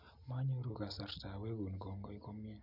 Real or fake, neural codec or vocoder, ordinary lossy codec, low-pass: real; none; none; 5.4 kHz